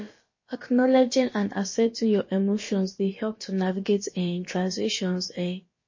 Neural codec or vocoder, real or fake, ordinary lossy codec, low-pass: codec, 16 kHz, about 1 kbps, DyCAST, with the encoder's durations; fake; MP3, 32 kbps; 7.2 kHz